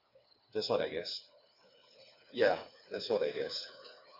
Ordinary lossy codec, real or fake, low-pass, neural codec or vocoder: none; fake; 5.4 kHz; codec, 16 kHz, 4 kbps, FreqCodec, smaller model